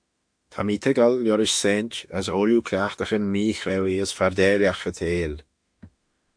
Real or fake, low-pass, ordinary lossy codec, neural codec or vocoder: fake; 9.9 kHz; AAC, 64 kbps; autoencoder, 48 kHz, 32 numbers a frame, DAC-VAE, trained on Japanese speech